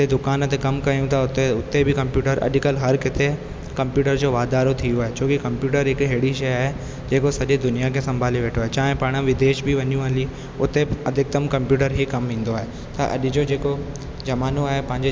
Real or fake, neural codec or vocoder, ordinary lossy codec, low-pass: real; none; none; none